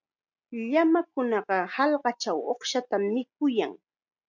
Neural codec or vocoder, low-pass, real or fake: none; 7.2 kHz; real